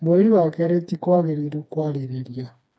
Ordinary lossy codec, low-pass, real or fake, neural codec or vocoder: none; none; fake; codec, 16 kHz, 2 kbps, FreqCodec, smaller model